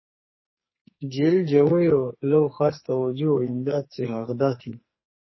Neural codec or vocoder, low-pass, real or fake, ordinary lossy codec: codec, 44.1 kHz, 2.6 kbps, SNAC; 7.2 kHz; fake; MP3, 24 kbps